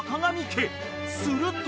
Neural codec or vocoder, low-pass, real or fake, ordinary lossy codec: none; none; real; none